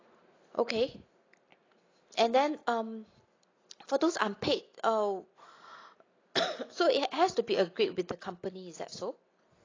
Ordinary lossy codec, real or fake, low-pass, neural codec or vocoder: AAC, 32 kbps; real; 7.2 kHz; none